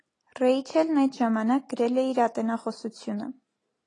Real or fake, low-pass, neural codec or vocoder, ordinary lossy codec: real; 10.8 kHz; none; AAC, 32 kbps